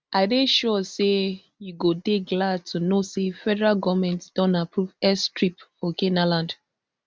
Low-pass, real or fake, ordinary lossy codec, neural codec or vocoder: none; real; none; none